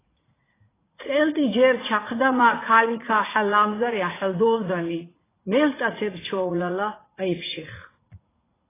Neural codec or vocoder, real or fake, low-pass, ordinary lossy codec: vocoder, 44.1 kHz, 80 mel bands, Vocos; fake; 3.6 kHz; AAC, 16 kbps